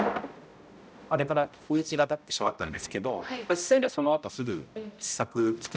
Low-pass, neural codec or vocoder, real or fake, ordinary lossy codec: none; codec, 16 kHz, 0.5 kbps, X-Codec, HuBERT features, trained on balanced general audio; fake; none